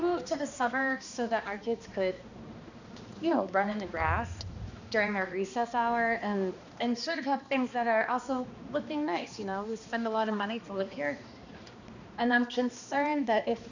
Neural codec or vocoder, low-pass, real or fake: codec, 16 kHz, 2 kbps, X-Codec, HuBERT features, trained on balanced general audio; 7.2 kHz; fake